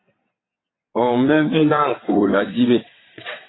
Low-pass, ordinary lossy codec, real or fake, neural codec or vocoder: 7.2 kHz; AAC, 16 kbps; fake; vocoder, 22.05 kHz, 80 mel bands, WaveNeXt